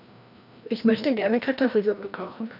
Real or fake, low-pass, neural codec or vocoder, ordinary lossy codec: fake; 5.4 kHz; codec, 16 kHz, 1 kbps, FreqCodec, larger model; none